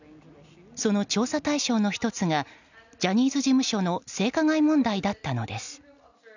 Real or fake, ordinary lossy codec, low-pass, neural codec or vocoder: real; none; 7.2 kHz; none